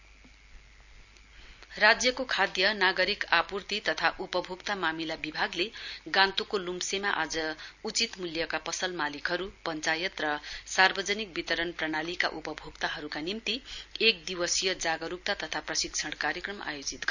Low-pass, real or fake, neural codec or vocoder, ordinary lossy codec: 7.2 kHz; real; none; none